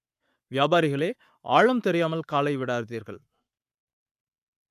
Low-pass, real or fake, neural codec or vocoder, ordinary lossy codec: 14.4 kHz; fake; codec, 44.1 kHz, 7.8 kbps, Pupu-Codec; none